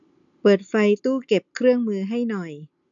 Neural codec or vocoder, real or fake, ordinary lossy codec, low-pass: none; real; none; 7.2 kHz